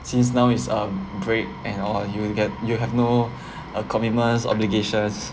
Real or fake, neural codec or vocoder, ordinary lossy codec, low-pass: real; none; none; none